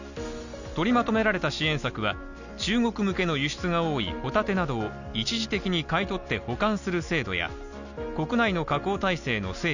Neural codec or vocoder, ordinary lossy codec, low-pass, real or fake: none; none; 7.2 kHz; real